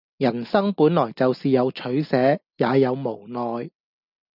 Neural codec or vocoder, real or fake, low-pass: none; real; 5.4 kHz